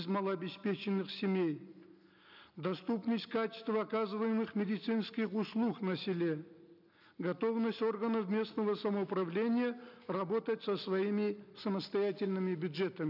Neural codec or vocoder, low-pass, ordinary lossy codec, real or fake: none; 5.4 kHz; none; real